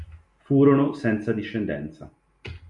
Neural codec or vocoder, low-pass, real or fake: none; 10.8 kHz; real